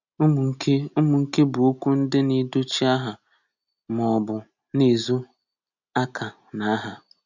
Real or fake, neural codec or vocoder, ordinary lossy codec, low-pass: real; none; none; 7.2 kHz